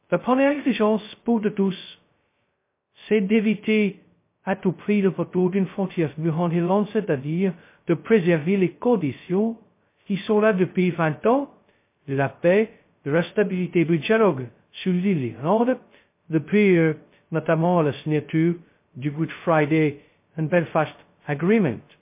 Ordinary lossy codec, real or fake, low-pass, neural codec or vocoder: MP3, 24 kbps; fake; 3.6 kHz; codec, 16 kHz, 0.2 kbps, FocalCodec